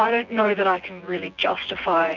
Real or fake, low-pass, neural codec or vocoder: fake; 7.2 kHz; vocoder, 24 kHz, 100 mel bands, Vocos